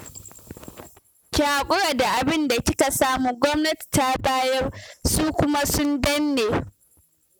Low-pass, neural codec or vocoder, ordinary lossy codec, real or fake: none; none; none; real